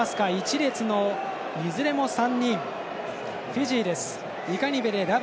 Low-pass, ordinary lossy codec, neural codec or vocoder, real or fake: none; none; none; real